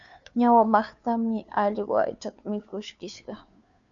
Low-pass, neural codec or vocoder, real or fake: 7.2 kHz; codec, 16 kHz, 2 kbps, FunCodec, trained on Chinese and English, 25 frames a second; fake